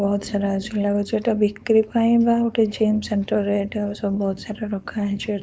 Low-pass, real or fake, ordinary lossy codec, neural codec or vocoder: none; fake; none; codec, 16 kHz, 4.8 kbps, FACodec